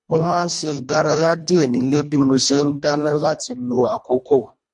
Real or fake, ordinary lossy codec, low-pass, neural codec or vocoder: fake; MP3, 64 kbps; 10.8 kHz; codec, 24 kHz, 1.5 kbps, HILCodec